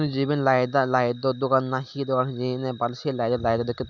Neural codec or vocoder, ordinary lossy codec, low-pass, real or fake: none; none; 7.2 kHz; real